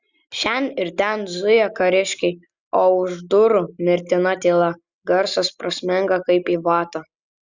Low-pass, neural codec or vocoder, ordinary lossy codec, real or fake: 7.2 kHz; none; Opus, 64 kbps; real